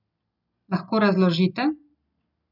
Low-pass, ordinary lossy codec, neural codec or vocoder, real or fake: 5.4 kHz; none; none; real